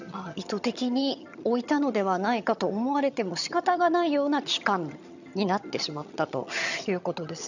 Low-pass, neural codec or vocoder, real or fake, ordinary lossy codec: 7.2 kHz; vocoder, 22.05 kHz, 80 mel bands, HiFi-GAN; fake; none